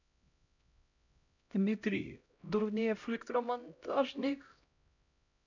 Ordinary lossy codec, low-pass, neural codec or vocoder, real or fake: none; 7.2 kHz; codec, 16 kHz, 0.5 kbps, X-Codec, HuBERT features, trained on LibriSpeech; fake